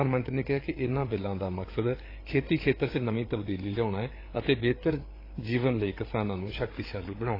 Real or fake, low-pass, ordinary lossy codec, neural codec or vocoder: fake; 5.4 kHz; AAC, 24 kbps; codec, 16 kHz, 8 kbps, FreqCodec, larger model